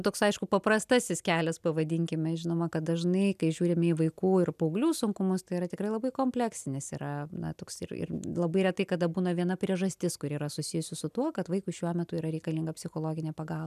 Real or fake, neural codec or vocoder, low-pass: real; none; 14.4 kHz